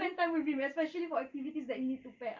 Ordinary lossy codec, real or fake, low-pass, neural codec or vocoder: Opus, 24 kbps; real; 7.2 kHz; none